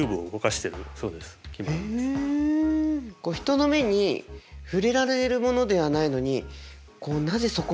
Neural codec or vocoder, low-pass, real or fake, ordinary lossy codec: none; none; real; none